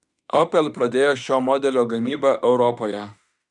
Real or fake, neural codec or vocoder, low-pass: fake; autoencoder, 48 kHz, 32 numbers a frame, DAC-VAE, trained on Japanese speech; 10.8 kHz